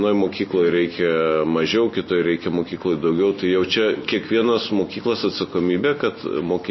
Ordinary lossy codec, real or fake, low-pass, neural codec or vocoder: MP3, 24 kbps; real; 7.2 kHz; none